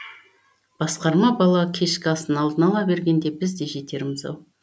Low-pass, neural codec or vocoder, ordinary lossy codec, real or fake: none; none; none; real